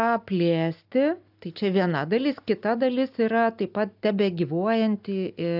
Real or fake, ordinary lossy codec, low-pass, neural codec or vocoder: real; AAC, 48 kbps; 5.4 kHz; none